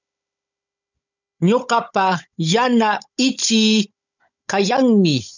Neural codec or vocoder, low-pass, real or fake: codec, 16 kHz, 16 kbps, FunCodec, trained on Chinese and English, 50 frames a second; 7.2 kHz; fake